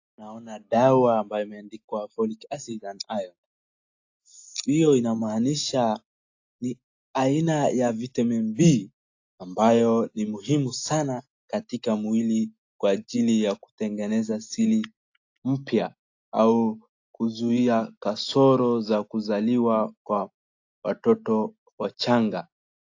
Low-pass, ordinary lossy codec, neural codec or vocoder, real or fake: 7.2 kHz; AAC, 48 kbps; none; real